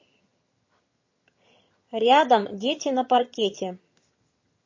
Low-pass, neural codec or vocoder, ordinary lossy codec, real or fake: 7.2 kHz; vocoder, 22.05 kHz, 80 mel bands, HiFi-GAN; MP3, 32 kbps; fake